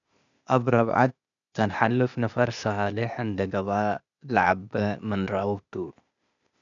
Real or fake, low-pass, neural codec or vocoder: fake; 7.2 kHz; codec, 16 kHz, 0.8 kbps, ZipCodec